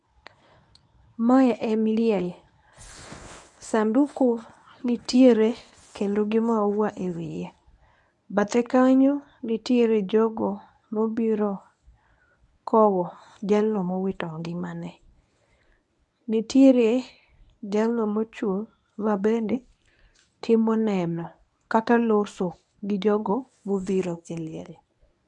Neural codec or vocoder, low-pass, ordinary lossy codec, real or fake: codec, 24 kHz, 0.9 kbps, WavTokenizer, medium speech release version 1; 10.8 kHz; none; fake